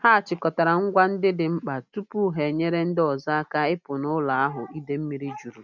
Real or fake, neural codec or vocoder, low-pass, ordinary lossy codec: real; none; 7.2 kHz; none